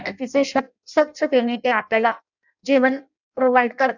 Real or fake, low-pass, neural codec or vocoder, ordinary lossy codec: fake; 7.2 kHz; codec, 16 kHz in and 24 kHz out, 0.6 kbps, FireRedTTS-2 codec; none